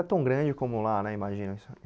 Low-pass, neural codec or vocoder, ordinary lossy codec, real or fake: none; none; none; real